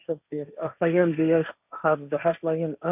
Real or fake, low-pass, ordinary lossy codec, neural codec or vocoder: fake; 3.6 kHz; none; codec, 16 kHz, 1.1 kbps, Voila-Tokenizer